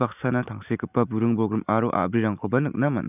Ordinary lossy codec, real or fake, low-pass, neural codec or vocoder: none; real; 3.6 kHz; none